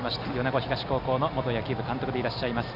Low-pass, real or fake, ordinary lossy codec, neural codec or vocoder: 5.4 kHz; real; none; none